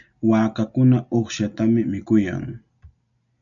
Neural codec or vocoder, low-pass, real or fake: none; 7.2 kHz; real